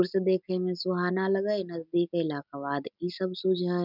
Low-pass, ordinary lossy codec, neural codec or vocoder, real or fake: 5.4 kHz; none; none; real